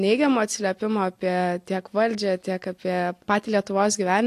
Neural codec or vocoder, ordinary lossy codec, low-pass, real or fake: none; AAC, 64 kbps; 14.4 kHz; real